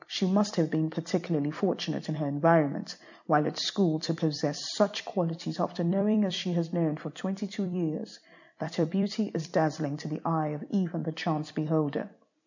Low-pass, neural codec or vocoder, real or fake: 7.2 kHz; vocoder, 44.1 kHz, 128 mel bands every 256 samples, BigVGAN v2; fake